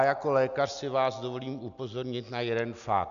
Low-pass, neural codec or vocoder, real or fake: 7.2 kHz; none; real